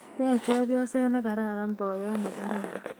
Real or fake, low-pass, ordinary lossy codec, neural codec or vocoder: fake; none; none; codec, 44.1 kHz, 2.6 kbps, SNAC